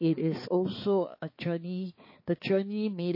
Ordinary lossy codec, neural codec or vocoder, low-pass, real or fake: MP3, 24 kbps; codec, 16 kHz, 4 kbps, X-Codec, HuBERT features, trained on balanced general audio; 5.4 kHz; fake